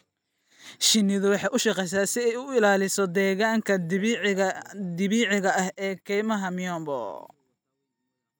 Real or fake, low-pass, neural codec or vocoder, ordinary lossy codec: real; none; none; none